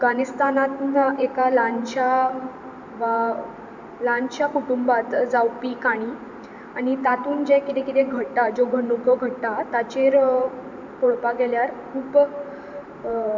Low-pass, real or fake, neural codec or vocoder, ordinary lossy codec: 7.2 kHz; fake; vocoder, 44.1 kHz, 128 mel bands every 512 samples, BigVGAN v2; MP3, 64 kbps